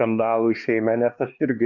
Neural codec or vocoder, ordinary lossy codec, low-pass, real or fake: codec, 16 kHz, 2 kbps, X-Codec, HuBERT features, trained on LibriSpeech; Opus, 64 kbps; 7.2 kHz; fake